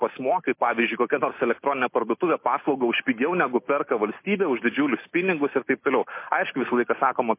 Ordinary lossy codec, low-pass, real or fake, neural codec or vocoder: MP3, 24 kbps; 3.6 kHz; real; none